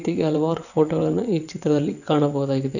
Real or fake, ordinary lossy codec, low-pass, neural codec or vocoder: fake; none; 7.2 kHz; vocoder, 22.05 kHz, 80 mel bands, WaveNeXt